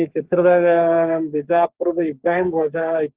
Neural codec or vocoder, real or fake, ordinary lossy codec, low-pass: codec, 44.1 kHz, 3.4 kbps, Pupu-Codec; fake; Opus, 16 kbps; 3.6 kHz